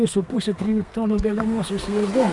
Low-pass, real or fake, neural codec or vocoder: 10.8 kHz; fake; codec, 32 kHz, 1.9 kbps, SNAC